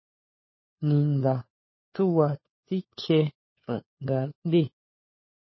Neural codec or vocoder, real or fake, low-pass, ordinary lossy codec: codec, 16 kHz, 4 kbps, FunCodec, trained on LibriTTS, 50 frames a second; fake; 7.2 kHz; MP3, 24 kbps